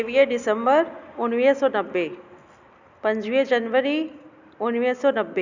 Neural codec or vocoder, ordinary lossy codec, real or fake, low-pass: none; none; real; 7.2 kHz